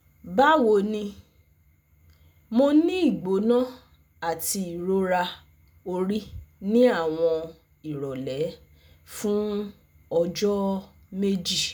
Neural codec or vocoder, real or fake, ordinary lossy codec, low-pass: none; real; none; none